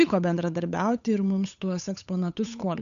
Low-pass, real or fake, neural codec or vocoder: 7.2 kHz; fake; codec, 16 kHz, 8 kbps, FunCodec, trained on Chinese and English, 25 frames a second